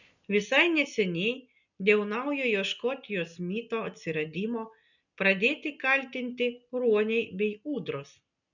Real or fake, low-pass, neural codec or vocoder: real; 7.2 kHz; none